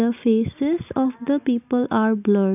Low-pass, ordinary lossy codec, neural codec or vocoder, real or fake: 3.6 kHz; none; none; real